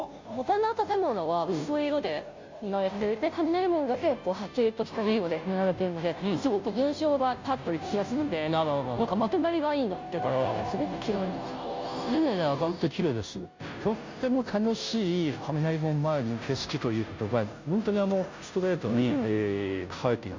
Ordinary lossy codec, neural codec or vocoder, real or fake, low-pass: MP3, 64 kbps; codec, 16 kHz, 0.5 kbps, FunCodec, trained on Chinese and English, 25 frames a second; fake; 7.2 kHz